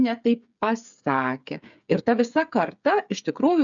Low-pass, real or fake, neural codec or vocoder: 7.2 kHz; fake; codec, 16 kHz, 8 kbps, FreqCodec, smaller model